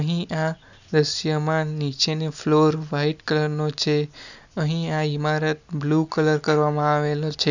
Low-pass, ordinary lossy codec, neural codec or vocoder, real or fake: 7.2 kHz; none; none; real